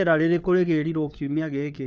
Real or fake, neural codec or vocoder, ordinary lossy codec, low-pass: fake; codec, 16 kHz, 4 kbps, FunCodec, trained on LibriTTS, 50 frames a second; none; none